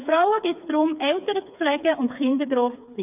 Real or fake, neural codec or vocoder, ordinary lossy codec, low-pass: fake; codec, 16 kHz, 4 kbps, FreqCodec, smaller model; none; 3.6 kHz